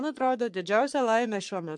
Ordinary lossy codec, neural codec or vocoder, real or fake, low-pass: MP3, 64 kbps; codec, 44.1 kHz, 3.4 kbps, Pupu-Codec; fake; 10.8 kHz